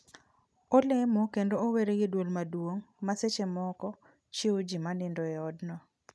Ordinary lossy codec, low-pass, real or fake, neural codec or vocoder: none; none; real; none